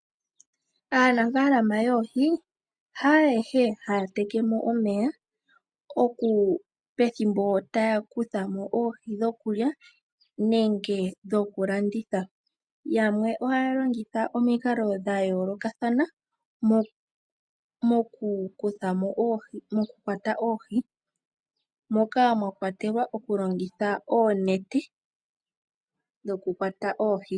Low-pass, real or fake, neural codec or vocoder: 9.9 kHz; real; none